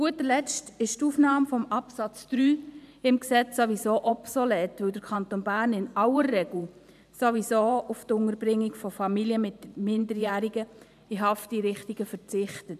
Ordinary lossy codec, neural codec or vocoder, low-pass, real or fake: none; vocoder, 44.1 kHz, 128 mel bands every 512 samples, BigVGAN v2; 14.4 kHz; fake